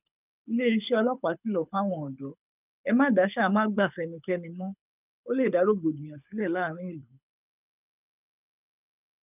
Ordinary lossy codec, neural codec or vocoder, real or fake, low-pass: none; codec, 24 kHz, 6 kbps, HILCodec; fake; 3.6 kHz